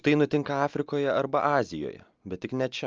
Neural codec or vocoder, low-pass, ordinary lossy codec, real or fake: none; 7.2 kHz; Opus, 24 kbps; real